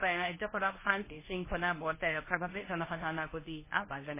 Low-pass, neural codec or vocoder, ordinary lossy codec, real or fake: 3.6 kHz; codec, 24 kHz, 0.9 kbps, WavTokenizer, medium speech release version 1; MP3, 16 kbps; fake